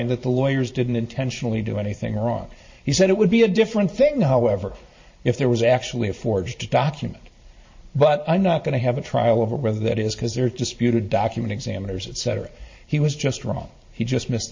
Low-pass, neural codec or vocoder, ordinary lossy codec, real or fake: 7.2 kHz; vocoder, 44.1 kHz, 128 mel bands every 512 samples, BigVGAN v2; MP3, 32 kbps; fake